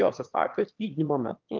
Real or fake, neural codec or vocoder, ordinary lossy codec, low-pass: fake; autoencoder, 22.05 kHz, a latent of 192 numbers a frame, VITS, trained on one speaker; Opus, 32 kbps; 7.2 kHz